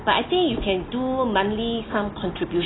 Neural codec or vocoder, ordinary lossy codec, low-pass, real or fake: none; AAC, 16 kbps; 7.2 kHz; real